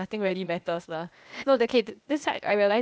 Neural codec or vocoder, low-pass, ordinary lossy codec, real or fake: codec, 16 kHz, 0.8 kbps, ZipCodec; none; none; fake